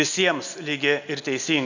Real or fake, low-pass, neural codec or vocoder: real; 7.2 kHz; none